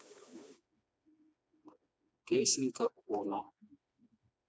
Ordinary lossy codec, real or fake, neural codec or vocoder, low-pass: none; fake; codec, 16 kHz, 2 kbps, FreqCodec, smaller model; none